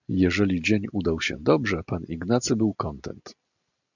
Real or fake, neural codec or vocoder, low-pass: real; none; 7.2 kHz